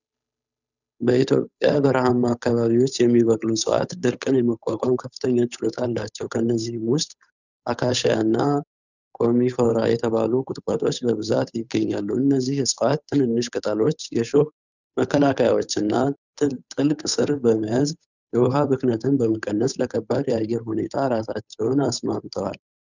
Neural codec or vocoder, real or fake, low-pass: codec, 16 kHz, 8 kbps, FunCodec, trained on Chinese and English, 25 frames a second; fake; 7.2 kHz